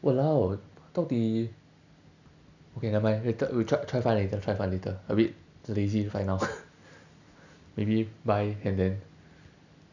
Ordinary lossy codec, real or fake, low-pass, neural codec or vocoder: none; real; 7.2 kHz; none